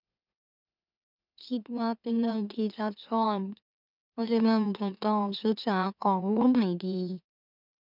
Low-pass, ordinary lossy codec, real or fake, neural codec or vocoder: 5.4 kHz; none; fake; autoencoder, 44.1 kHz, a latent of 192 numbers a frame, MeloTTS